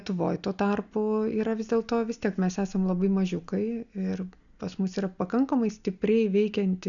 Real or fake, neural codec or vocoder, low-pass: real; none; 7.2 kHz